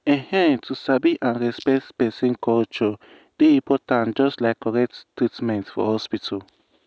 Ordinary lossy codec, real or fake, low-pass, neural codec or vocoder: none; real; none; none